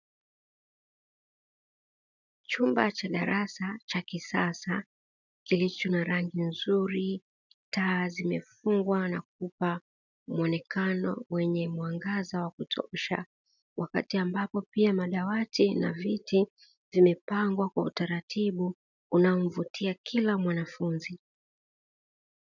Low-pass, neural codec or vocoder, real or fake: 7.2 kHz; none; real